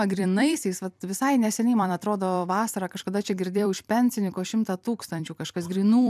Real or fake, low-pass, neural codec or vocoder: fake; 14.4 kHz; vocoder, 44.1 kHz, 128 mel bands every 256 samples, BigVGAN v2